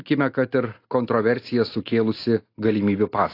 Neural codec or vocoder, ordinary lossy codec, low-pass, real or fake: none; AAC, 32 kbps; 5.4 kHz; real